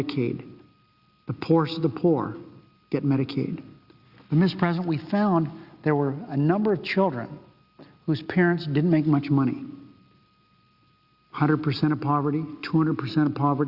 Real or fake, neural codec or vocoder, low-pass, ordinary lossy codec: real; none; 5.4 kHz; Opus, 64 kbps